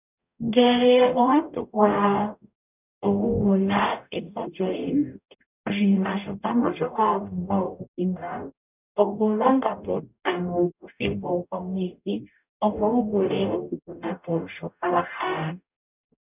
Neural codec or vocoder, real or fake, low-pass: codec, 44.1 kHz, 0.9 kbps, DAC; fake; 3.6 kHz